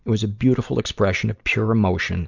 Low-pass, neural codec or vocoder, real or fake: 7.2 kHz; none; real